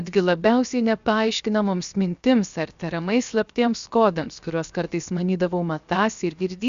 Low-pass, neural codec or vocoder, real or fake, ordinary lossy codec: 7.2 kHz; codec, 16 kHz, 0.7 kbps, FocalCodec; fake; Opus, 64 kbps